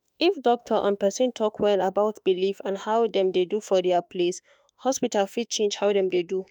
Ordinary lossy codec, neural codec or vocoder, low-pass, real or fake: none; autoencoder, 48 kHz, 32 numbers a frame, DAC-VAE, trained on Japanese speech; 19.8 kHz; fake